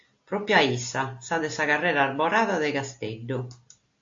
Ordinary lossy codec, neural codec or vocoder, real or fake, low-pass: AAC, 48 kbps; none; real; 7.2 kHz